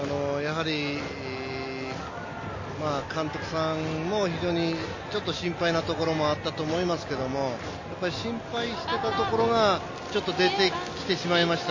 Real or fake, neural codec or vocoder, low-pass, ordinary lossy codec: real; none; 7.2 kHz; MP3, 32 kbps